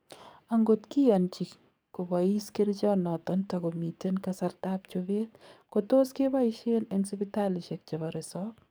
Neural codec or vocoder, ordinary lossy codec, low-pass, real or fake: codec, 44.1 kHz, 7.8 kbps, DAC; none; none; fake